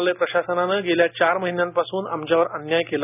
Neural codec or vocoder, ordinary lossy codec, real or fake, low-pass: none; none; real; 3.6 kHz